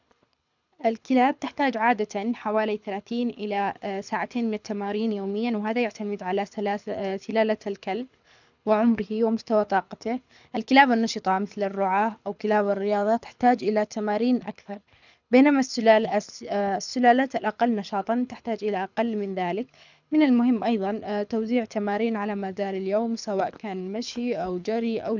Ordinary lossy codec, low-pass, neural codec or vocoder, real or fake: none; 7.2 kHz; codec, 24 kHz, 6 kbps, HILCodec; fake